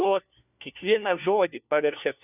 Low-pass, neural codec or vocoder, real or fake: 3.6 kHz; codec, 16 kHz, 1 kbps, FunCodec, trained on LibriTTS, 50 frames a second; fake